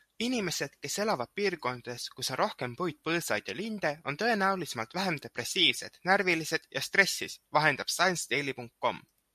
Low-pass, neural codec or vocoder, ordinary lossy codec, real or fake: 14.4 kHz; none; MP3, 64 kbps; real